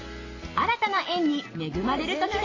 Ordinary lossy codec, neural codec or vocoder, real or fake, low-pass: AAC, 32 kbps; none; real; 7.2 kHz